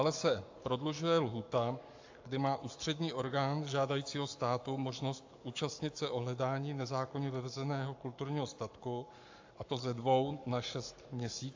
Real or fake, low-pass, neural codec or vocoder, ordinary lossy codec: fake; 7.2 kHz; codec, 44.1 kHz, 7.8 kbps, Pupu-Codec; AAC, 48 kbps